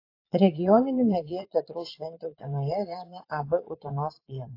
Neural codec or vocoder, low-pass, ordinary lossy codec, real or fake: vocoder, 22.05 kHz, 80 mel bands, Vocos; 5.4 kHz; AAC, 32 kbps; fake